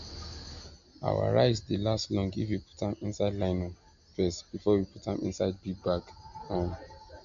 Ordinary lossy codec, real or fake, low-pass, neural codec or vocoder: none; real; 7.2 kHz; none